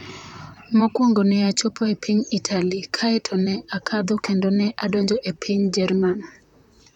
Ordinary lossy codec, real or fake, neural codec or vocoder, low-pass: none; fake; vocoder, 44.1 kHz, 128 mel bands, Pupu-Vocoder; 19.8 kHz